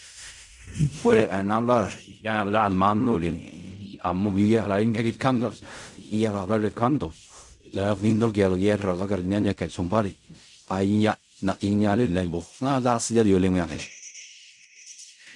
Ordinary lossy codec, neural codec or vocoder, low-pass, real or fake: none; codec, 16 kHz in and 24 kHz out, 0.4 kbps, LongCat-Audio-Codec, fine tuned four codebook decoder; 10.8 kHz; fake